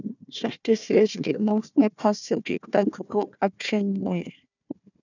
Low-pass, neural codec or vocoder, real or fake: 7.2 kHz; codec, 16 kHz, 1 kbps, FunCodec, trained on Chinese and English, 50 frames a second; fake